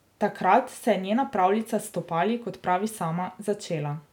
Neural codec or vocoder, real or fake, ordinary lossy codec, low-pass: none; real; none; 19.8 kHz